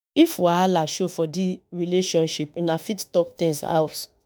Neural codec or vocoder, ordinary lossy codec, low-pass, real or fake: autoencoder, 48 kHz, 32 numbers a frame, DAC-VAE, trained on Japanese speech; none; none; fake